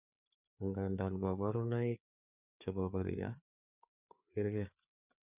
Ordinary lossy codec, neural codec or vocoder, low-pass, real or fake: none; codec, 16 kHz, 4 kbps, FreqCodec, larger model; 3.6 kHz; fake